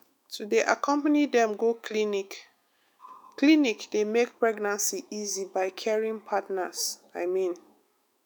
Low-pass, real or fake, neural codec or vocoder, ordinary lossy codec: none; fake; autoencoder, 48 kHz, 128 numbers a frame, DAC-VAE, trained on Japanese speech; none